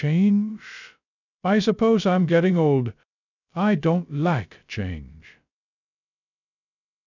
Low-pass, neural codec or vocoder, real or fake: 7.2 kHz; codec, 16 kHz, 0.2 kbps, FocalCodec; fake